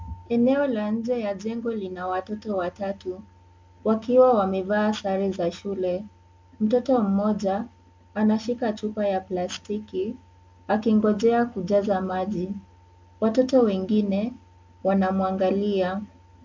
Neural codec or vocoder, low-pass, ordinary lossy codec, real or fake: none; 7.2 kHz; MP3, 64 kbps; real